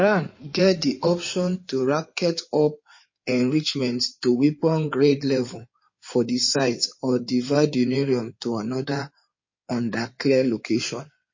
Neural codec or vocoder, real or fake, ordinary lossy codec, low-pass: codec, 16 kHz in and 24 kHz out, 2.2 kbps, FireRedTTS-2 codec; fake; MP3, 32 kbps; 7.2 kHz